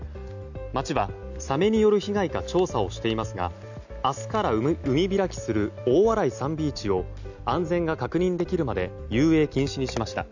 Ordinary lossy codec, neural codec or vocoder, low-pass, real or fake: none; none; 7.2 kHz; real